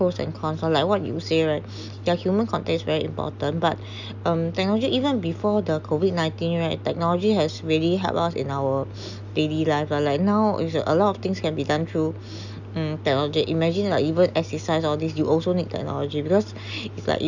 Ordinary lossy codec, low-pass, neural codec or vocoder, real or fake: none; 7.2 kHz; none; real